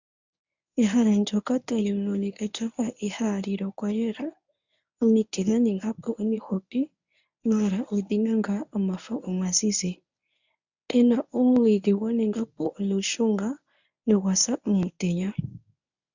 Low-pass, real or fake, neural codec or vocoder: 7.2 kHz; fake; codec, 24 kHz, 0.9 kbps, WavTokenizer, medium speech release version 1